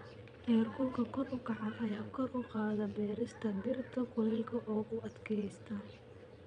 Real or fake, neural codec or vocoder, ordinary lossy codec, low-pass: fake; vocoder, 22.05 kHz, 80 mel bands, Vocos; none; 9.9 kHz